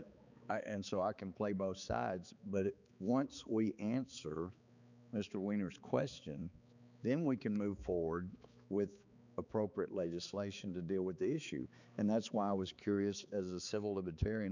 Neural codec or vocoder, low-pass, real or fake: codec, 16 kHz, 4 kbps, X-Codec, HuBERT features, trained on balanced general audio; 7.2 kHz; fake